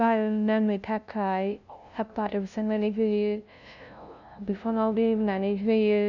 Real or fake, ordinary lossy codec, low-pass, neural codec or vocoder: fake; none; 7.2 kHz; codec, 16 kHz, 0.5 kbps, FunCodec, trained on LibriTTS, 25 frames a second